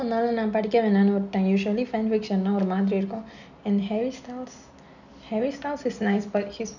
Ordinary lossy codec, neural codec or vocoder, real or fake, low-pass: none; none; real; 7.2 kHz